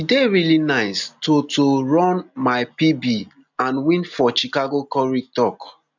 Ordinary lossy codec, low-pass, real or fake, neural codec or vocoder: none; 7.2 kHz; real; none